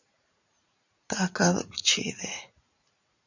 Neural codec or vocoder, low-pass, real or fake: vocoder, 44.1 kHz, 80 mel bands, Vocos; 7.2 kHz; fake